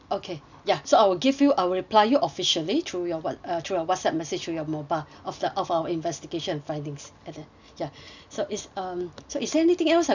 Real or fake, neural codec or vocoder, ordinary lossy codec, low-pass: real; none; none; 7.2 kHz